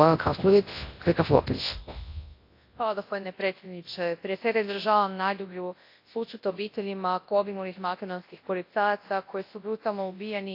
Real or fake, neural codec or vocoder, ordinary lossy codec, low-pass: fake; codec, 24 kHz, 0.9 kbps, WavTokenizer, large speech release; AAC, 32 kbps; 5.4 kHz